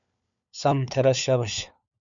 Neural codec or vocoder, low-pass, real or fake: codec, 16 kHz, 4 kbps, FunCodec, trained on LibriTTS, 50 frames a second; 7.2 kHz; fake